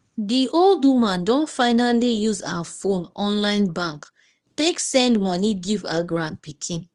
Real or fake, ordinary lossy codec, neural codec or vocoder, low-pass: fake; Opus, 24 kbps; codec, 24 kHz, 0.9 kbps, WavTokenizer, small release; 10.8 kHz